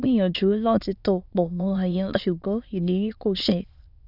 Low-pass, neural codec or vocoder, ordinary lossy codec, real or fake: 5.4 kHz; autoencoder, 22.05 kHz, a latent of 192 numbers a frame, VITS, trained on many speakers; none; fake